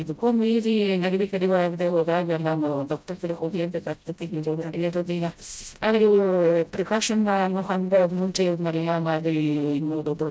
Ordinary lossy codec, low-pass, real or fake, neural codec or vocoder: none; none; fake; codec, 16 kHz, 0.5 kbps, FreqCodec, smaller model